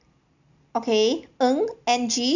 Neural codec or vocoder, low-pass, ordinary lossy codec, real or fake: none; 7.2 kHz; none; real